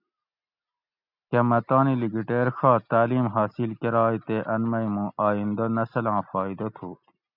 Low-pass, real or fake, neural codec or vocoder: 5.4 kHz; real; none